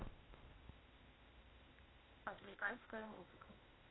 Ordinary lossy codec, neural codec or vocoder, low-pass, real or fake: AAC, 16 kbps; codec, 16 kHz, 0.8 kbps, ZipCodec; 7.2 kHz; fake